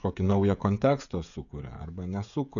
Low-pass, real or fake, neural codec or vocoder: 7.2 kHz; fake; codec, 16 kHz, 16 kbps, FreqCodec, smaller model